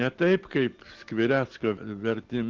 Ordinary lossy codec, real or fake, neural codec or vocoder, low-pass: Opus, 16 kbps; real; none; 7.2 kHz